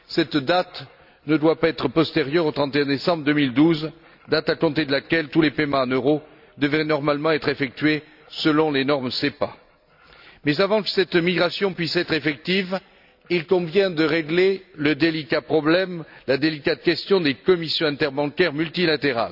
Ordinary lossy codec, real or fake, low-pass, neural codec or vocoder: none; real; 5.4 kHz; none